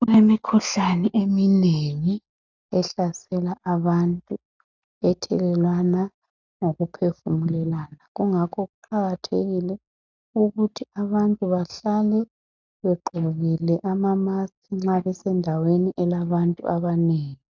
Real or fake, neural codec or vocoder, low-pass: real; none; 7.2 kHz